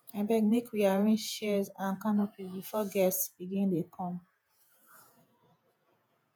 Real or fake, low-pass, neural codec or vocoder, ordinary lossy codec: fake; none; vocoder, 48 kHz, 128 mel bands, Vocos; none